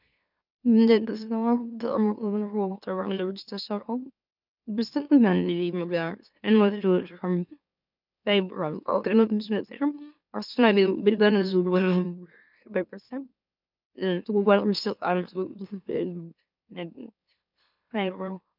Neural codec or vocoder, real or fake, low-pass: autoencoder, 44.1 kHz, a latent of 192 numbers a frame, MeloTTS; fake; 5.4 kHz